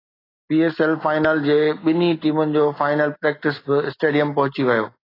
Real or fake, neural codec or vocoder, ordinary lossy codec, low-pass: real; none; AAC, 24 kbps; 5.4 kHz